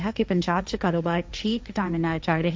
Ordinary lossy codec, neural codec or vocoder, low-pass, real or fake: none; codec, 16 kHz, 1.1 kbps, Voila-Tokenizer; none; fake